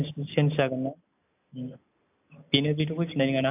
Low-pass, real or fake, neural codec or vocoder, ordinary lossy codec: 3.6 kHz; real; none; AAC, 32 kbps